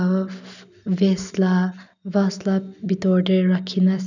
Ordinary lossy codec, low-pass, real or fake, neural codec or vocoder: none; 7.2 kHz; real; none